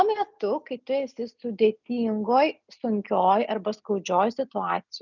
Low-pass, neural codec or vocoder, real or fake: 7.2 kHz; none; real